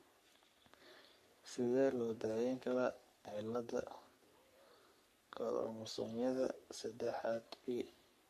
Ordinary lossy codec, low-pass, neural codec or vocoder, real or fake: MP3, 64 kbps; 14.4 kHz; codec, 44.1 kHz, 3.4 kbps, Pupu-Codec; fake